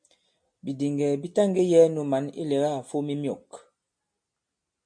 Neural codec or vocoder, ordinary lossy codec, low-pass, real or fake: none; MP3, 48 kbps; 9.9 kHz; real